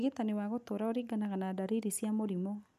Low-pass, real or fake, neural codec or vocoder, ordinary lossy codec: 14.4 kHz; real; none; none